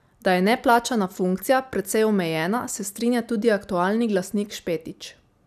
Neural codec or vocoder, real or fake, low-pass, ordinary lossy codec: none; real; 14.4 kHz; none